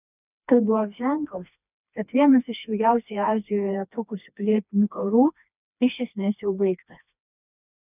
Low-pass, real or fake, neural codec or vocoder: 3.6 kHz; fake; codec, 16 kHz, 2 kbps, FreqCodec, smaller model